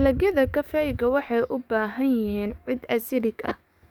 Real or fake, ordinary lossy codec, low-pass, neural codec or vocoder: fake; none; 19.8 kHz; codec, 44.1 kHz, 7.8 kbps, DAC